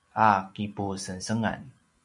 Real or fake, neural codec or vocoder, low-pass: real; none; 10.8 kHz